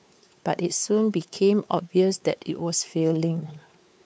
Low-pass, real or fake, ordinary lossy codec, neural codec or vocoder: none; fake; none; codec, 16 kHz, 4 kbps, X-Codec, WavLM features, trained on Multilingual LibriSpeech